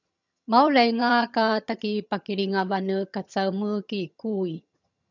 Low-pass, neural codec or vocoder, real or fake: 7.2 kHz; vocoder, 22.05 kHz, 80 mel bands, HiFi-GAN; fake